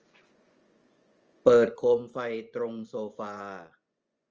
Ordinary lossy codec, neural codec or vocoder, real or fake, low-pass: Opus, 24 kbps; vocoder, 24 kHz, 100 mel bands, Vocos; fake; 7.2 kHz